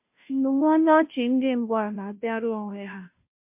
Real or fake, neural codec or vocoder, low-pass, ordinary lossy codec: fake; codec, 16 kHz, 0.5 kbps, FunCodec, trained on Chinese and English, 25 frames a second; 3.6 kHz; MP3, 32 kbps